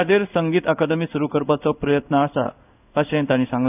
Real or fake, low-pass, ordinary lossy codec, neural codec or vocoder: fake; 3.6 kHz; none; codec, 16 kHz in and 24 kHz out, 1 kbps, XY-Tokenizer